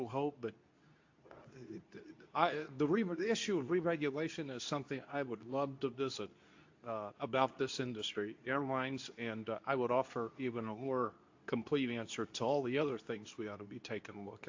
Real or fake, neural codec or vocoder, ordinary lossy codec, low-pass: fake; codec, 24 kHz, 0.9 kbps, WavTokenizer, medium speech release version 2; AAC, 48 kbps; 7.2 kHz